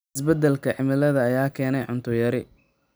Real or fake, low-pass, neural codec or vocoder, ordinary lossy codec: real; none; none; none